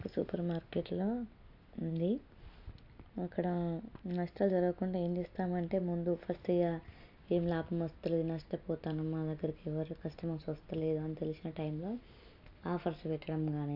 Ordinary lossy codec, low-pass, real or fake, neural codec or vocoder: AAC, 48 kbps; 5.4 kHz; real; none